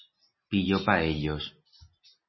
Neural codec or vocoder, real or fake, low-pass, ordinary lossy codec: none; real; 7.2 kHz; MP3, 24 kbps